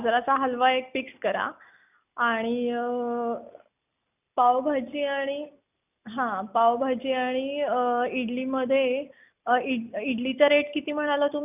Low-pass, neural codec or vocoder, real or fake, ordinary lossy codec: 3.6 kHz; none; real; none